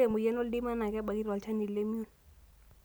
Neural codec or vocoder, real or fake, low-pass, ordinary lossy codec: none; real; none; none